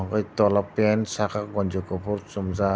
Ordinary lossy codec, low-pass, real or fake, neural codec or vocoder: none; none; real; none